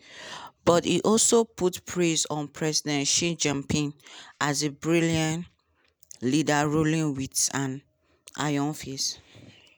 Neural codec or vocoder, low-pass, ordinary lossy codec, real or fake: none; none; none; real